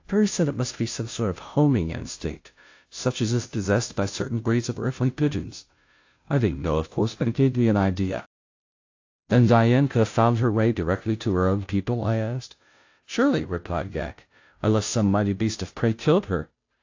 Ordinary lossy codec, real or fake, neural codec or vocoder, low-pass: AAC, 48 kbps; fake; codec, 16 kHz, 0.5 kbps, FunCodec, trained on Chinese and English, 25 frames a second; 7.2 kHz